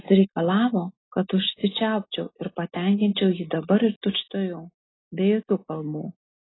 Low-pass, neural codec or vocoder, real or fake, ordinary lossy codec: 7.2 kHz; none; real; AAC, 16 kbps